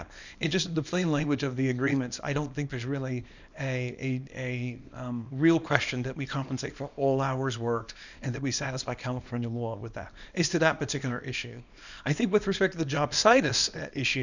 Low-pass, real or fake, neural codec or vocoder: 7.2 kHz; fake; codec, 24 kHz, 0.9 kbps, WavTokenizer, small release